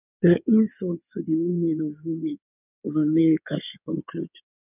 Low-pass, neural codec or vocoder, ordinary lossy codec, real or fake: 3.6 kHz; codec, 16 kHz in and 24 kHz out, 2.2 kbps, FireRedTTS-2 codec; none; fake